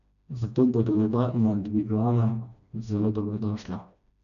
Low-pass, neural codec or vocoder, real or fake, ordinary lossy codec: 7.2 kHz; codec, 16 kHz, 1 kbps, FreqCodec, smaller model; fake; none